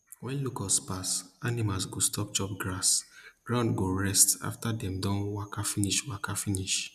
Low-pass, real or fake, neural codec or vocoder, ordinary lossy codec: 14.4 kHz; real; none; none